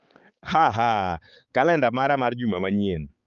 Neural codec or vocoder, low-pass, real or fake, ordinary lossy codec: codec, 16 kHz, 4 kbps, X-Codec, HuBERT features, trained on balanced general audio; 7.2 kHz; fake; Opus, 32 kbps